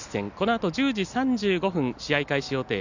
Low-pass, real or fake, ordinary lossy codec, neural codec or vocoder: 7.2 kHz; real; none; none